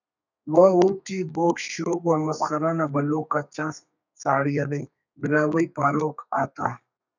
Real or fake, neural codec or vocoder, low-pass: fake; codec, 32 kHz, 1.9 kbps, SNAC; 7.2 kHz